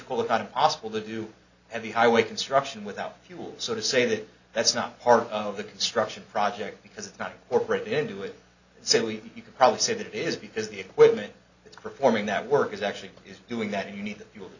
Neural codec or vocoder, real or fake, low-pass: none; real; 7.2 kHz